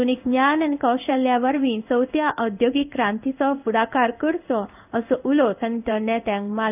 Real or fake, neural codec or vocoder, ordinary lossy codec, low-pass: fake; codec, 16 kHz in and 24 kHz out, 1 kbps, XY-Tokenizer; none; 3.6 kHz